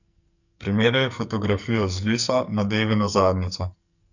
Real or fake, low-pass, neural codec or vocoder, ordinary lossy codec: fake; 7.2 kHz; codec, 44.1 kHz, 2.6 kbps, SNAC; none